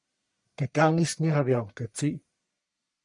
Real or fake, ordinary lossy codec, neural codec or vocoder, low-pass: fake; MP3, 96 kbps; codec, 44.1 kHz, 1.7 kbps, Pupu-Codec; 10.8 kHz